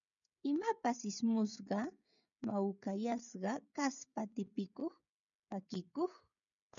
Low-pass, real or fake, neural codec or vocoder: 7.2 kHz; fake; codec, 16 kHz, 16 kbps, FreqCodec, smaller model